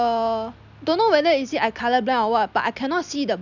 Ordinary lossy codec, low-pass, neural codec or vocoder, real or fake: none; 7.2 kHz; none; real